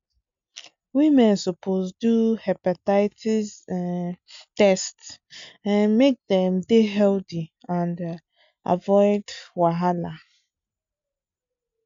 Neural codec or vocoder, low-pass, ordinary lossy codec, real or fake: none; 7.2 kHz; MP3, 64 kbps; real